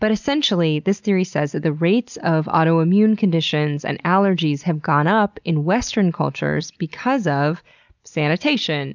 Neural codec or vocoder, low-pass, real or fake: none; 7.2 kHz; real